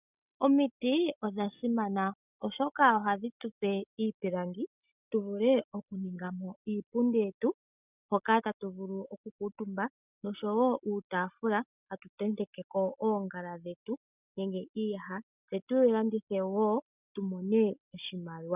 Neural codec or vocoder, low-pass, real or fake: none; 3.6 kHz; real